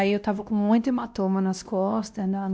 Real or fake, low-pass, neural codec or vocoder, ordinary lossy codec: fake; none; codec, 16 kHz, 1 kbps, X-Codec, WavLM features, trained on Multilingual LibriSpeech; none